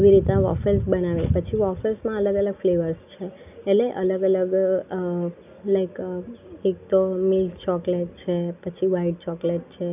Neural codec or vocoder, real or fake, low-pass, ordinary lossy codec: none; real; 3.6 kHz; none